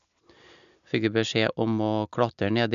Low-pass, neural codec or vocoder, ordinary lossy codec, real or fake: 7.2 kHz; none; none; real